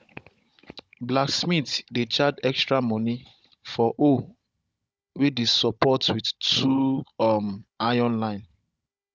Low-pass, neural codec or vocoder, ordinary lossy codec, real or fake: none; codec, 16 kHz, 16 kbps, FunCodec, trained on Chinese and English, 50 frames a second; none; fake